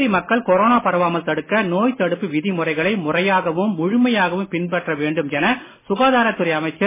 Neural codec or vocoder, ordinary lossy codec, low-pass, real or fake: none; MP3, 16 kbps; 3.6 kHz; real